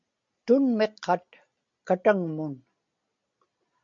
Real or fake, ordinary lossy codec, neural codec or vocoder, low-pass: real; MP3, 48 kbps; none; 7.2 kHz